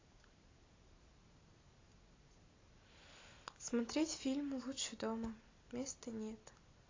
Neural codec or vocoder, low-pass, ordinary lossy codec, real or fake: none; 7.2 kHz; AAC, 32 kbps; real